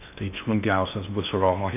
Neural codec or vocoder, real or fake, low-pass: codec, 16 kHz in and 24 kHz out, 0.6 kbps, FocalCodec, streaming, 4096 codes; fake; 3.6 kHz